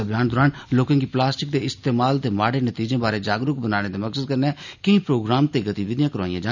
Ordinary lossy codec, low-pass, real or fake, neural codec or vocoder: none; 7.2 kHz; real; none